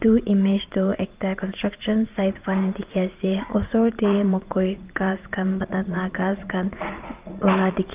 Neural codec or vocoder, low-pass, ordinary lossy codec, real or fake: vocoder, 44.1 kHz, 80 mel bands, Vocos; 3.6 kHz; Opus, 32 kbps; fake